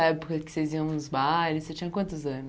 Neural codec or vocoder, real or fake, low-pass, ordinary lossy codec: none; real; none; none